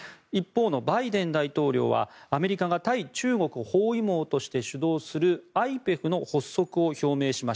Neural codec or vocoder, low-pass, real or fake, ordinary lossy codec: none; none; real; none